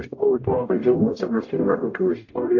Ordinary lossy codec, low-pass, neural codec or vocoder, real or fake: AAC, 48 kbps; 7.2 kHz; codec, 44.1 kHz, 0.9 kbps, DAC; fake